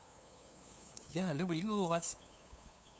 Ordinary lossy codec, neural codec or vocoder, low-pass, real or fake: none; codec, 16 kHz, 2 kbps, FunCodec, trained on LibriTTS, 25 frames a second; none; fake